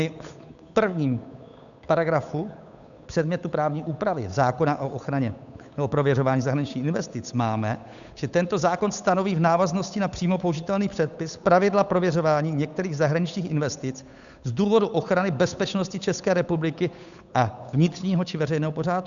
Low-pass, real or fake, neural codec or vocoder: 7.2 kHz; fake; codec, 16 kHz, 8 kbps, FunCodec, trained on Chinese and English, 25 frames a second